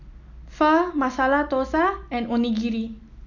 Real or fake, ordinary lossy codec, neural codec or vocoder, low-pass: real; none; none; 7.2 kHz